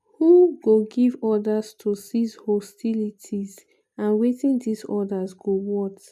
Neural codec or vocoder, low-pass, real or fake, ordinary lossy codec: none; 14.4 kHz; real; none